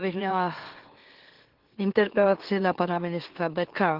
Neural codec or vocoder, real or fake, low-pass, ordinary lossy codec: autoencoder, 44.1 kHz, a latent of 192 numbers a frame, MeloTTS; fake; 5.4 kHz; Opus, 16 kbps